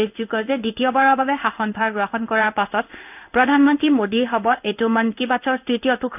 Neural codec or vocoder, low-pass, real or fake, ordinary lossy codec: codec, 16 kHz in and 24 kHz out, 1 kbps, XY-Tokenizer; 3.6 kHz; fake; none